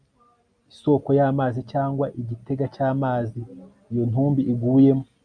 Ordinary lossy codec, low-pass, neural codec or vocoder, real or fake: MP3, 64 kbps; 9.9 kHz; none; real